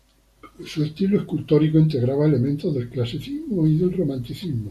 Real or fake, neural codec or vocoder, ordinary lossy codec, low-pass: real; none; MP3, 96 kbps; 14.4 kHz